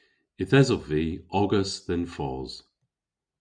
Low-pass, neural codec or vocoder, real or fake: 9.9 kHz; none; real